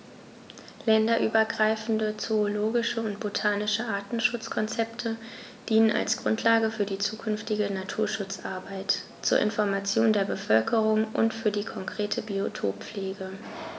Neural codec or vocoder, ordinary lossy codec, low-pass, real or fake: none; none; none; real